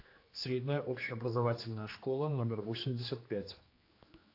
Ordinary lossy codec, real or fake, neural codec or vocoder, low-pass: AAC, 32 kbps; fake; codec, 16 kHz, 2 kbps, X-Codec, HuBERT features, trained on general audio; 5.4 kHz